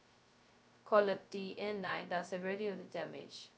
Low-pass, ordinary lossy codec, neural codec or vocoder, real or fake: none; none; codec, 16 kHz, 0.2 kbps, FocalCodec; fake